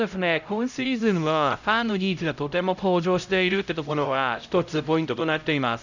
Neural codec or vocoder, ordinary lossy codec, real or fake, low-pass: codec, 16 kHz, 0.5 kbps, X-Codec, HuBERT features, trained on LibriSpeech; none; fake; 7.2 kHz